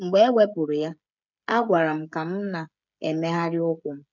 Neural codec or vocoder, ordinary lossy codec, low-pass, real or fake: codec, 16 kHz, 16 kbps, FreqCodec, smaller model; none; 7.2 kHz; fake